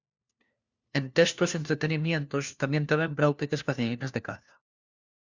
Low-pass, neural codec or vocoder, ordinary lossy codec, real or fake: 7.2 kHz; codec, 16 kHz, 1 kbps, FunCodec, trained on LibriTTS, 50 frames a second; Opus, 64 kbps; fake